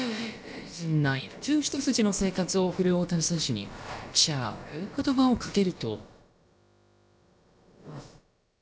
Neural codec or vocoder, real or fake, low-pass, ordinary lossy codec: codec, 16 kHz, about 1 kbps, DyCAST, with the encoder's durations; fake; none; none